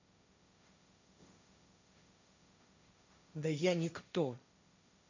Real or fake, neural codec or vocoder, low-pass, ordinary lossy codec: fake; codec, 16 kHz, 1.1 kbps, Voila-Tokenizer; 7.2 kHz; AAC, 48 kbps